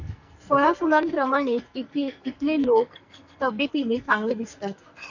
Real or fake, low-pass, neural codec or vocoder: fake; 7.2 kHz; codec, 44.1 kHz, 2.6 kbps, SNAC